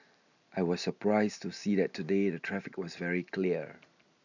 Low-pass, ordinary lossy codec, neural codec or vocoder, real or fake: 7.2 kHz; none; none; real